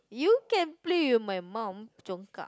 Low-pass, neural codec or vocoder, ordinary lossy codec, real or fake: none; none; none; real